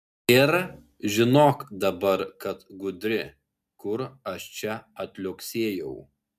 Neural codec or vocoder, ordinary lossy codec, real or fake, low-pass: none; MP3, 96 kbps; real; 14.4 kHz